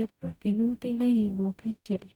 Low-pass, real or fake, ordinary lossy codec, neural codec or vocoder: 19.8 kHz; fake; none; codec, 44.1 kHz, 0.9 kbps, DAC